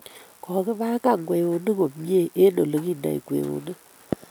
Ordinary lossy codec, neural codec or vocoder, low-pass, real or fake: none; none; none; real